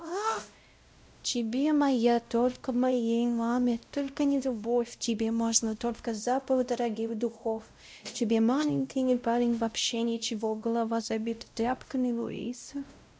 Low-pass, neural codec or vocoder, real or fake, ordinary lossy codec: none; codec, 16 kHz, 0.5 kbps, X-Codec, WavLM features, trained on Multilingual LibriSpeech; fake; none